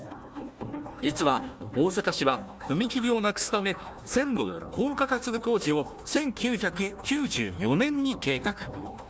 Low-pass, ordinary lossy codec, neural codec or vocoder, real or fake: none; none; codec, 16 kHz, 1 kbps, FunCodec, trained on Chinese and English, 50 frames a second; fake